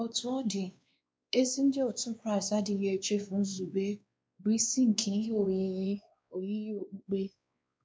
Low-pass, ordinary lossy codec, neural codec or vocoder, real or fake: none; none; codec, 16 kHz, 2 kbps, X-Codec, WavLM features, trained on Multilingual LibriSpeech; fake